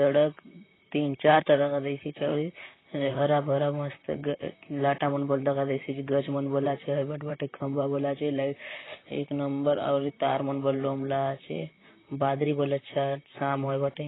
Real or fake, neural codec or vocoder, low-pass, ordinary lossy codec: fake; vocoder, 44.1 kHz, 128 mel bands, Pupu-Vocoder; 7.2 kHz; AAC, 16 kbps